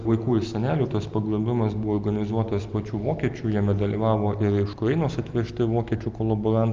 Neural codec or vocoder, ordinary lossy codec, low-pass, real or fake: none; Opus, 24 kbps; 7.2 kHz; real